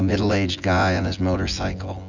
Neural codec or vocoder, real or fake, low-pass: vocoder, 24 kHz, 100 mel bands, Vocos; fake; 7.2 kHz